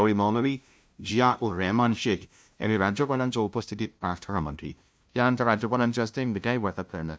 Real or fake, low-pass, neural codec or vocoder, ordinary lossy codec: fake; none; codec, 16 kHz, 0.5 kbps, FunCodec, trained on LibriTTS, 25 frames a second; none